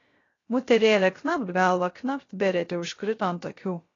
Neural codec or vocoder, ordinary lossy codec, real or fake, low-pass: codec, 16 kHz, 0.3 kbps, FocalCodec; AAC, 32 kbps; fake; 7.2 kHz